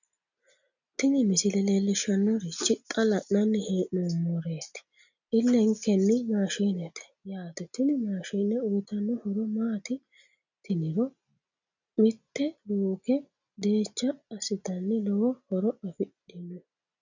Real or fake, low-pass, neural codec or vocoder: real; 7.2 kHz; none